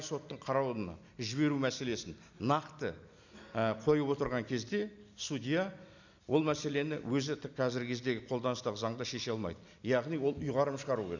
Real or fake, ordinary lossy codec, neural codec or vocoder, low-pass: real; none; none; 7.2 kHz